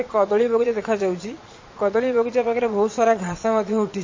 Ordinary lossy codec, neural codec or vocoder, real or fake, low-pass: MP3, 32 kbps; vocoder, 22.05 kHz, 80 mel bands, Vocos; fake; 7.2 kHz